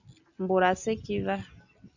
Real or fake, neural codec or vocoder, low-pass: real; none; 7.2 kHz